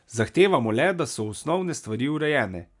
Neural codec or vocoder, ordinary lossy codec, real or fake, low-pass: none; none; real; 10.8 kHz